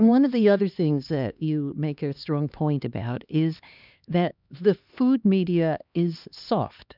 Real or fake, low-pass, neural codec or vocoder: fake; 5.4 kHz; codec, 16 kHz, 2 kbps, X-Codec, HuBERT features, trained on LibriSpeech